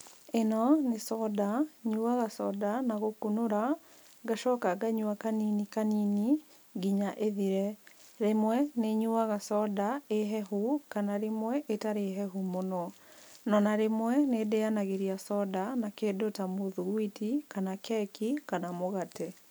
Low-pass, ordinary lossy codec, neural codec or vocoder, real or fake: none; none; none; real